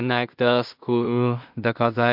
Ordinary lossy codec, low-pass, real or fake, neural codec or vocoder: MP3, 48 kbps; 5.4 kHz; fake; codec, 16 kHz in and 24 kHz out, 0.4 kbps, LongCat-Audio-Codec, two codebook decoder